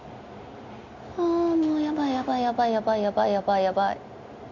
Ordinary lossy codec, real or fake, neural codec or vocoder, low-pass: none; real; none; 7.2 kHz